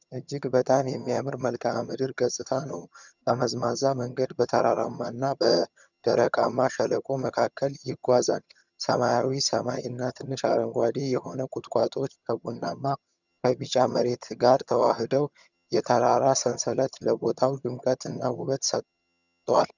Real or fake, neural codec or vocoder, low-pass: fake; vocoder, 22.05 kHz, 80 mel bands, HiFi-GAN; 7.2 kHz